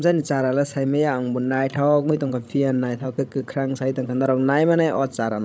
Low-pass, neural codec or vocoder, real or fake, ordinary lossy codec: none; codec, 16 kHz, 16 kbps, FreqCodec, larger model; fake; none